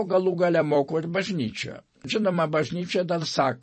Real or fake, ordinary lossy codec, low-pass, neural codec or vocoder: fake; MP3, 32 kbps; 10.8 kHz; vocoder, 44.1 kHz, 128 mel bands every 256 samples, BigVGAN v2